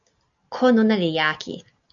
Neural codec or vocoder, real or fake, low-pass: none; real; 7.2 kHz